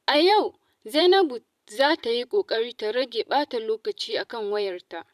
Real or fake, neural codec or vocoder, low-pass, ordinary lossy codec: fake; vocoder, 44.1 kHz, 128 mel bands every 512 samples, BigVGAN v2; 14.4 kHz; none